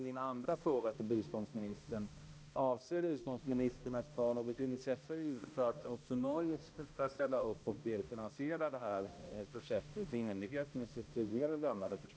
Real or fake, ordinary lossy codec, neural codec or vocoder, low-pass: fake; none; codec, 16 kHz, 1 kbps, X-Codec, HuBERT features, trained on balanced general audio; none